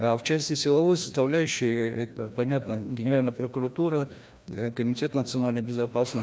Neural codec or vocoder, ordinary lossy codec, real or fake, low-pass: codec, 16 kHz, 1 kbps, FreqCodec, larger model; none; fake; none